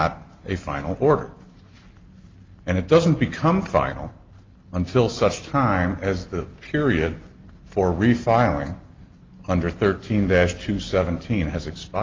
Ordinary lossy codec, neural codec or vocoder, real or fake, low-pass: Opus, 32 kbps; none; real; 7.2 kHz